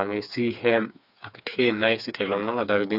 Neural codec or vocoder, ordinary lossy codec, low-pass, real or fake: codec, 16 kHz, 4 kbps, FreqCodec, smaller model; none; 5.4 kHz; fake